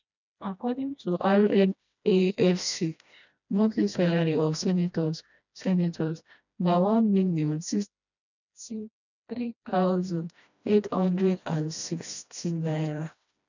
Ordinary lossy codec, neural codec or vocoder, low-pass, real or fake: none; codec, 16 kHz, 1 kbps, FreqCodec, smaller model; 7.2 kHz; fake